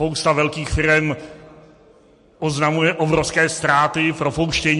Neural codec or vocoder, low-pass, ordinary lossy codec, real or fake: none; 14.4 kHz; MP3, 48 kbps; real